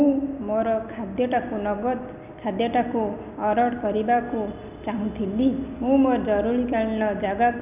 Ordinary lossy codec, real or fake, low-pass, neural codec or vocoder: none; real; 3.6 kHz; none